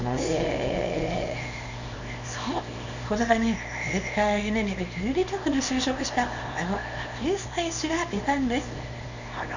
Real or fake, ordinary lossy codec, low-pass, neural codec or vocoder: fake; Opus, 64 kbps; 7.2 kHz; codec, 24 kHz, 0.9 kbps, WavTokenizer, small release